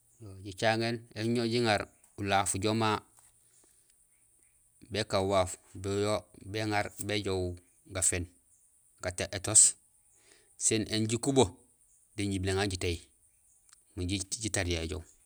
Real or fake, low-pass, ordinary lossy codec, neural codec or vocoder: real; none; none; none